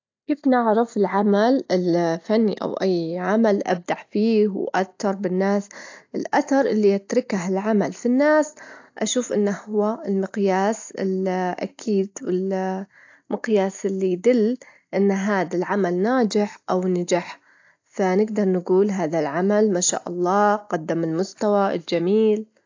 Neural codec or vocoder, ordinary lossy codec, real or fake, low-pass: none; AAC, 48 kbps; real; 7.2 kHz